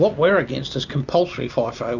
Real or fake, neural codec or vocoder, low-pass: real; none; 7.2 kHz